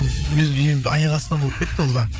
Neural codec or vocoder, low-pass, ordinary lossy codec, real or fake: codec, 16 kHz, 4 kbps, FreqCodec, larger model; none; none; fake